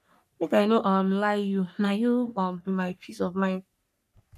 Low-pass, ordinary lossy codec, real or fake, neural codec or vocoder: 14.4 kHz; none; fake; codec, 44.1 kHz, 3.4 kbps, Pupu-Codec